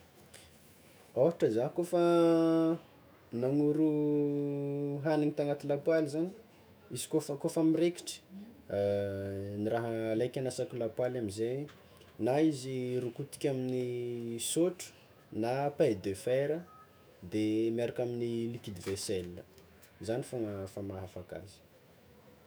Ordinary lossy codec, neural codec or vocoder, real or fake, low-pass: none; autoencoder, 48 kHz, 128 numbers a frame, DAC-VAE, trained on Japanese speech; fake; none